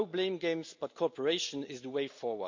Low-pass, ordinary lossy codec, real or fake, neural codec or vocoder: 7.2 kHz; MP3, 64 kbps; real; none